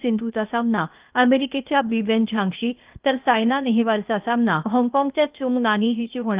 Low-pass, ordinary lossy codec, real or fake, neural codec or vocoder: 3.6 kHz; Opus, 32 kbps; fake; codec, 16 kHz, 0.8 kbps, ZipCodec